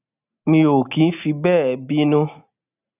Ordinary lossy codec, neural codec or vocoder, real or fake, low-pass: none; none; real; 3.6 kHz